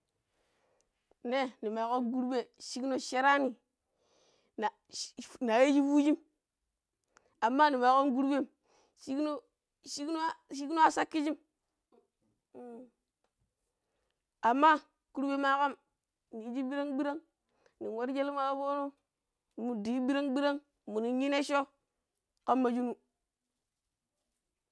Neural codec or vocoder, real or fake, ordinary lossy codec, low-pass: none; real; none; none